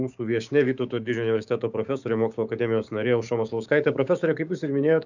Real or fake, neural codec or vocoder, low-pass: fake; codec, 16 kHz, 6 kbps, DAC; 7.2 kHz